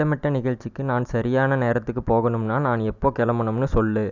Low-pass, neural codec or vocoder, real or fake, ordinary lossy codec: 7.2 kHz; none; real; none